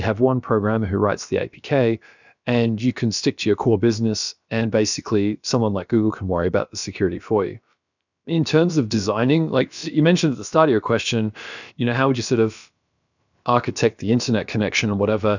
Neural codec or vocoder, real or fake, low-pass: codec, 16 kHz, about 1 kbps, DyCAST, with the encoder's durations; fake; 7.2 kHz